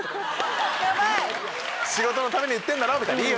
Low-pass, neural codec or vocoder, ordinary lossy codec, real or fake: none; none; none; real